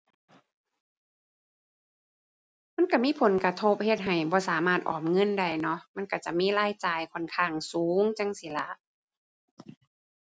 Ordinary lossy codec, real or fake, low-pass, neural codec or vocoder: none; real; none; none